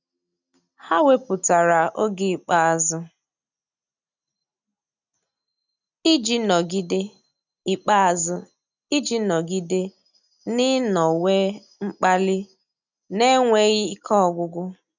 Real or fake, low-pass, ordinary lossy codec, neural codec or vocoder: real; 7.2 kHz; none; none